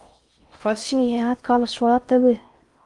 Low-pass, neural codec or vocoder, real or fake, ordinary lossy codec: 10.8 kHz; codec, 16 kHz in and 24 kHz out, 0.6 kbps, FocalCodec, streaming, 4096 codes; fake; Opus, 32 kbps